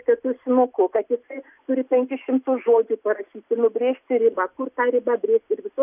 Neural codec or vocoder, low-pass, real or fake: none; 3.6 kHz; real